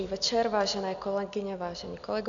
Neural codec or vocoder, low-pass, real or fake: none; 7.2 kHz; real